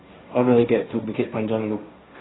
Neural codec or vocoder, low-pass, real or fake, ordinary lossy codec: codec, 16 kHz, 1.1 kbps, Voila-Tokenizer; 7.2 kHz; fake; AAC, 16 kbps